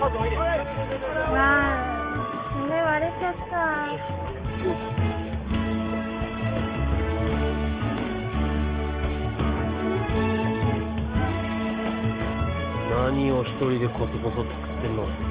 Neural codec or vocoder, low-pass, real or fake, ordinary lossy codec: codec, 44.1 kHz, 7.8 kbps, DAC; 3.6 kHz; fake; Opus, 24 kbps